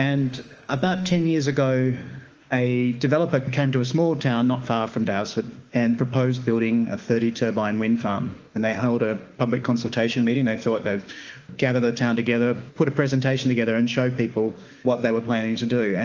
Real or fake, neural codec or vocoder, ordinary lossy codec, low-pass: fake; autoencoder, 48 kHz, 32 numbers a frame, DAC-VAE, trained on Japanese speech; Opus, 24 kbps; 7.2 kHz